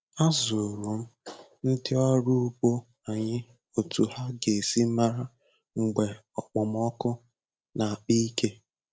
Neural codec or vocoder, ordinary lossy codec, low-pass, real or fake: none; none; none; real